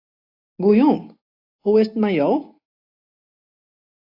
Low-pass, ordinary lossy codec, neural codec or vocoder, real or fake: 5.4 kHz; AAC, 32 kbps; none; real